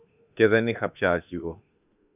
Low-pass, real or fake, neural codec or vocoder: 3.6 kHz; fake; autoencoder, 48 kHz, 32 numbers a frame, DAC-VAE, trained on Japanese speech